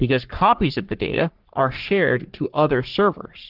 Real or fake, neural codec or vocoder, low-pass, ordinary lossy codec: fake; codec, 44.1 kHz, 3.4 kbps, Pupu-Codec; 5.4 kHz; Opus, 16 kbps